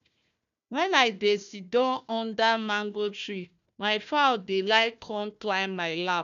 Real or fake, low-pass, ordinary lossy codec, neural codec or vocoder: fake; 7.2 kHz; none; codec, 16 kHz, 1 kbps, FunCodec, trained on Chinese and English, 50 frames a second